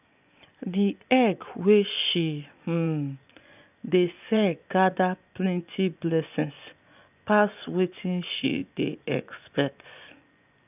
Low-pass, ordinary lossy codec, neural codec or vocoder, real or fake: 3.6 kHz; none; vocoder, 24 kHz, 100 mel bands, Vocos; fake